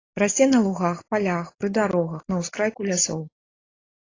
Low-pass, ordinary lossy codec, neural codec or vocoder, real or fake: 7.2 kHz; AAC, 32 kbps; none; real